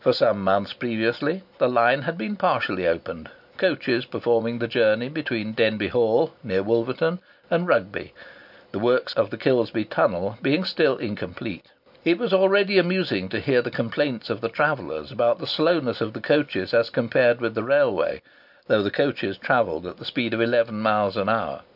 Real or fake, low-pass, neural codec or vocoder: real; 5.4 kHz; none